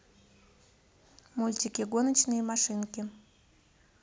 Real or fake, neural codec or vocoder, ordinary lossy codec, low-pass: real; none; none; none